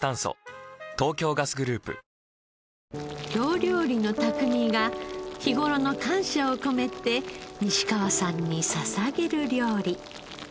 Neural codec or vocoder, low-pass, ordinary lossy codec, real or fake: none; none; none; real